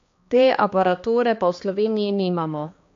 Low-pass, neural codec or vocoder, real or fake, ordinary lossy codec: 7.2 kHz; codec, 16 kHz, 2 kbps, X-Codec, HuBERT features, trained on balanced general audio; fake; AAC, 48 kbps